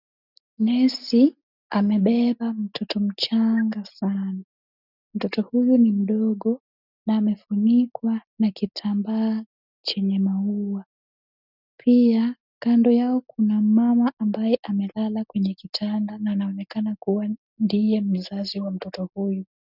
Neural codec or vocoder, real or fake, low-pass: none; real; 5.4 kHz